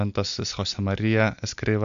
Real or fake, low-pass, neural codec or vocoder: real; 7.2 kHz; none